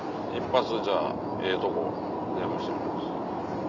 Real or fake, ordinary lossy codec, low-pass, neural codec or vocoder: real; none; 7.2 kHz; none